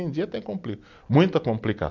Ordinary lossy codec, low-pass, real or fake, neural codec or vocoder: none; 7.2 kHz; real; none